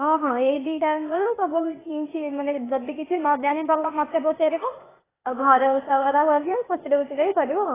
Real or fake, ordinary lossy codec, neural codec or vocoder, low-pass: fake; AAC, 16 kbps; codec, 16 kHz, 0.8 kbps, ZipCodec; 3.6 kHz